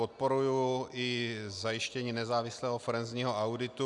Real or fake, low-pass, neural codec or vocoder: real; 10.8 kHz; none